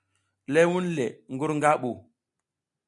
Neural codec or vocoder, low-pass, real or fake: none; 10.8 kHz; real